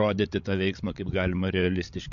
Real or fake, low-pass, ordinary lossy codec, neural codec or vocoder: fake; 7.2 kHz; MP3, 48 kbps; codec, 16 kHz, 16 kbps, FreqCodec, larger model